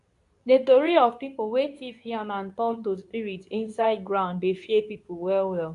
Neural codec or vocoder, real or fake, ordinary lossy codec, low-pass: codec, 24 kHz, 0.9 kbps, WavTokenizer, medium speech release version 2; fake; MP3, 96 kbps; 10.8 kHz